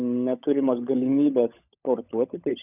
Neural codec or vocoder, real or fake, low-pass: codec, 16 kHz, 16 kbps, FunCodec, trained on LibriTTS, 50 frames a second; fake; 3.6 kHz